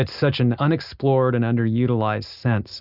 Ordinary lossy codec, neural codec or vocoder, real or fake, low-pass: Opus, 64 kbps; none; real; 5.4 kHz